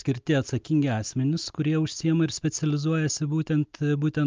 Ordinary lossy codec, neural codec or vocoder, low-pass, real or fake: Opus, 24 kbps; none; 7.2 kHz; real